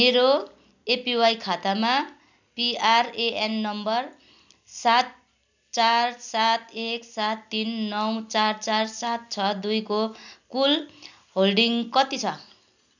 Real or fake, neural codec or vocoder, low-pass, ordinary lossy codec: real; none; 7.2 kHz; none